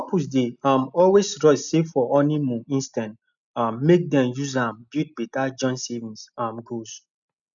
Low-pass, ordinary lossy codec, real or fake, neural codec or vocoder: 7.2 kHz; none; real; none